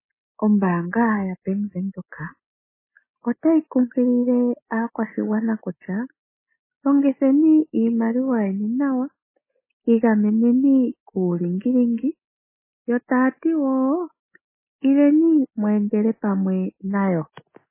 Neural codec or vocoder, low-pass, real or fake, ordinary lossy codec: none; 3.6 kHz; real; MP3, 16 kbps